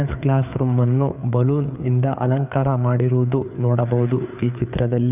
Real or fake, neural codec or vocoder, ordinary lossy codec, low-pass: fake; codec, 16 kHz, 4 kbps, FreqCodec, larger model; none; 3.6 kHz